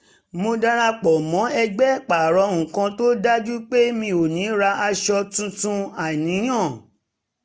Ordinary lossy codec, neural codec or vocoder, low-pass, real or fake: none; none; none; real